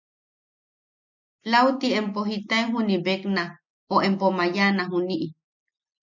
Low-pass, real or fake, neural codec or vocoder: 7.2 kHz; real; none